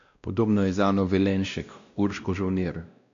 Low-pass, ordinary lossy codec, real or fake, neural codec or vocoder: 7.2 kHz; AAC, 64 kbps; fake; codec, 16 kHz, 1 kbps, X-Codec, WavLM features, trained on Multilingual LibriSpeech